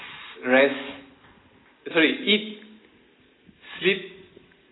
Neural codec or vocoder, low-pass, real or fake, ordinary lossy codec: none; 7.2 kHz; real; AAC, 16 kbps